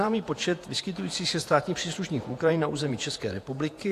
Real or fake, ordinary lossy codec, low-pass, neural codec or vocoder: fake; AAC, 64 kbps; 14.4 kHz; vocoder, 48 kHz, 128 mel bands, Vocos